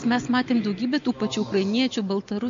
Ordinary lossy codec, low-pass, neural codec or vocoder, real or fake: MP3, 48 kbps; 7.2 kHz; none; real